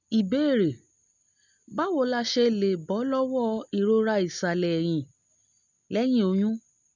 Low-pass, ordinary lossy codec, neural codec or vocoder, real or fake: 7.2 kHz; none; none; real